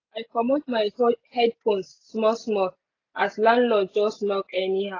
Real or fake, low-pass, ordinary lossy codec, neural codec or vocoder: real; 7.2 kHz; AAC, 32 kbps; none